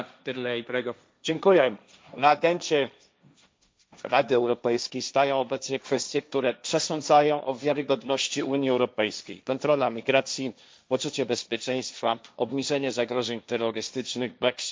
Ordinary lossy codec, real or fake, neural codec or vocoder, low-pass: none; fake; codec, 16 kHz, 1.1 kbps, Voila-Tokenizer; none